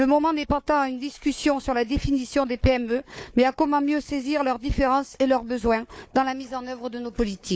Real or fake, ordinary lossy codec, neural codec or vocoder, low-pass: fake; none; codec, 16 kHz, 4 kbps, FunCodec, trained on Chinese and English, 50 frames a second; none